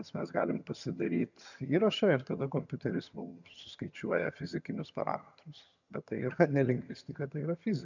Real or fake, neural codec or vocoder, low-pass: fake; vocoder, 22.05 kHz, 80 mel bands, HiFi-GAN; 7.2 kHz